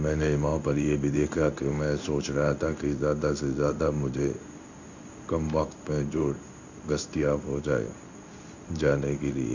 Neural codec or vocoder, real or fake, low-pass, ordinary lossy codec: codec, 16 kHz in and 24 kHz out, 1 kbps, XY-Tokenizer; fake; 7.2 kHz; none